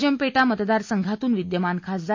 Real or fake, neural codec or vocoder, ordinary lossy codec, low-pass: real; none; MP3, 48 kbps; 7.2 kHz